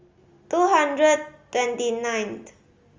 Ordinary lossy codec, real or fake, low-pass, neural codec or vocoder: Opus, 32 kbps; real; 7.2 kHz; none